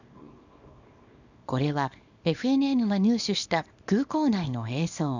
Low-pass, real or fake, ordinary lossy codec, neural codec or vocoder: 7.2 kHz; fake; none; codec, 24 kHz, 0.9 kbps, WavTokenizer, small release